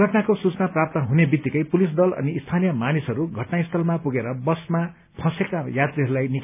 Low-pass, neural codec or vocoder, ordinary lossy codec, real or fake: 3.6 kHz; none; none; real